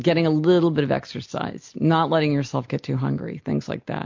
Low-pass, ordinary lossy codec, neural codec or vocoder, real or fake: 7.2 kHz; MP3, 48 kbps; none; real